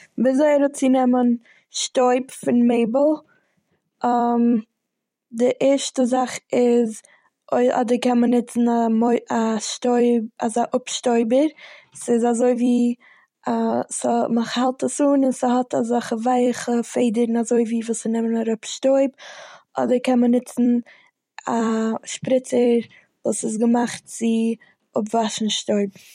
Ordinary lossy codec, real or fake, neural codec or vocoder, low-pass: MP3, 64 kbps; fake; vocoder, 44.1 kHz, 128 mel bands every 256 samples, BigVGAN v2; 19.8 kHz